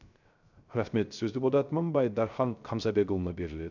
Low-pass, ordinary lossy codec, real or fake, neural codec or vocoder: 7.2 kHz; none; fake; codec, 16 kHz, 0.3 kbps, FocalCodec